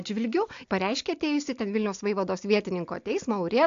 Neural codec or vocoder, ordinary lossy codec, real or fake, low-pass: none; AAC, 64 kbps; real; 7.2 kHz